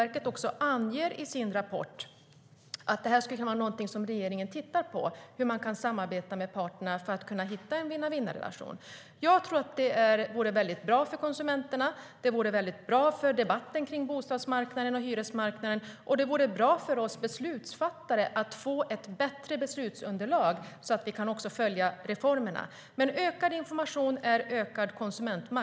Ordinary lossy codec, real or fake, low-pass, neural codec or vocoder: none; real; none; none